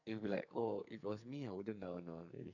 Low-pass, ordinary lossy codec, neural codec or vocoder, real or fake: 7.2 kHz; none; codec, 44.1 kHz, 2.6 kbps, SNAC; fake